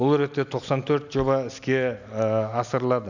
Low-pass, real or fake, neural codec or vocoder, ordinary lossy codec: 7.2 kHz; real; none; none